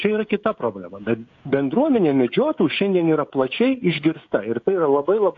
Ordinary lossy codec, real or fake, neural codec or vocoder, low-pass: AAC, 48 kbps; fake; codec, 16 kHz, 16 kbps, FreqCodec, smaller model; 7.2 kHz